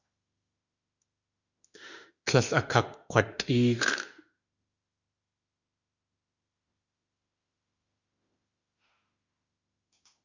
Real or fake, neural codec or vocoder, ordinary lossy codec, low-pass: fake; autoencoder, 48 kHz, 32 numbers a frame, DAC-VAE, trained on Japanese speech; Opus, 64 kbps; 7.2 kHz